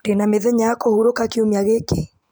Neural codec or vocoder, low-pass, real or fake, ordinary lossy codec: none; none; real; none